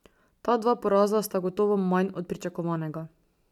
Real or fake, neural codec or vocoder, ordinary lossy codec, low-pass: real; none; none; 19.8 kHz